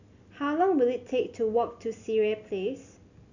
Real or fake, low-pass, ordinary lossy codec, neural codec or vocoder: real; 7.2 kHz; none; none